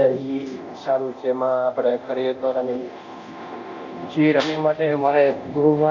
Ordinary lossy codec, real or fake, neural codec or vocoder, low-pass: none; fake; codec, 24 kHz, 0.9 kbps, DualCodec; 7.2 kHz